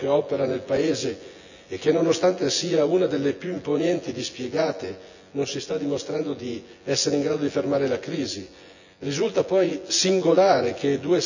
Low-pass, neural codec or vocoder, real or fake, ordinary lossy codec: 7.2 kHz; vocoder, 24 kHz, 100 mel bands, Vocos; fake; none